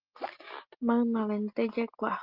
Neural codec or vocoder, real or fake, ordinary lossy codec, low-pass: none; real; Opus, 24 kbps; 5.4 kHz